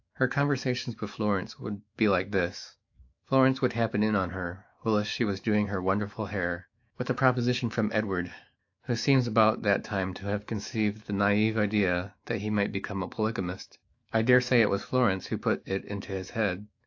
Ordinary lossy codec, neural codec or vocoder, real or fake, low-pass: AAC, 48 kbps; autoencoder, 48 kHz, 128 numbers a frame, DAC-VAE, trained on Japanese speech; fake; 7.2 kHz